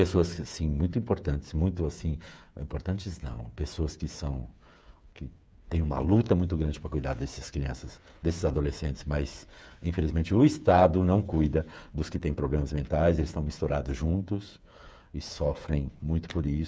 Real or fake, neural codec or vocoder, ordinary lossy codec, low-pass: fake; codec, 16 kHz, 8 kbps, FreqCodec, smaller model; none; none